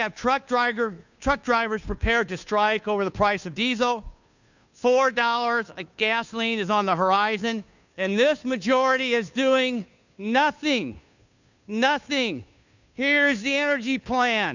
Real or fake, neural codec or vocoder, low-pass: fake; codec, 16 kHz, 2 kbps, FunCodec, trained on Chinese and English, 25 frames a second; 7.2 kHz